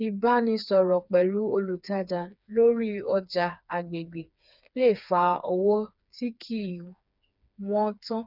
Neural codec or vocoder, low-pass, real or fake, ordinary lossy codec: codec, 16 kHz, 4 kbps, FreqCodec, smaller model; 5.4 kHz; fake; none